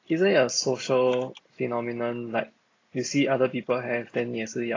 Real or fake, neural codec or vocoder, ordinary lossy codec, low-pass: real; none; AAC, 32 kbps; 7.2 kHz